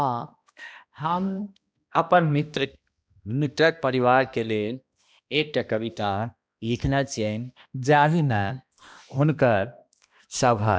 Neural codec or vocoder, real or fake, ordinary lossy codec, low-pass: codec, 16 kHz, 1 kbps, X-Codec, HuBERT features, trained on balanced general audio; fake; none; none